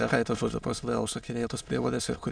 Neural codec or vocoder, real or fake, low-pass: autoencoder, 22.05 kHz, a latent of 192 numbers a frame, VITS, trained on many speakers; fake; 9.9 kHz